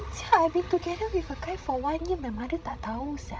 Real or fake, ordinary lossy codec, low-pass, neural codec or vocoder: fake; none; none; codec, 16 kHz, 16 kbps, FreqCodec, larger model